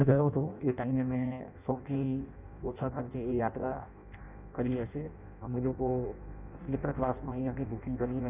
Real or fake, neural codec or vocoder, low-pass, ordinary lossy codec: fake; codec, 16 kHz in and 24 kHz out, 0.6 kbps, FireRedTTS-2 codec; 3.6 kHz; none